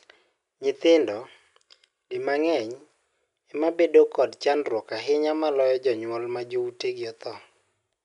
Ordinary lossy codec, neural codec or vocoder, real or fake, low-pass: none; none; real; 10.8 kHz